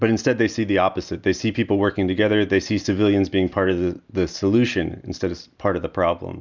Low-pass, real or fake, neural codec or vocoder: 7.2 kHz; real; none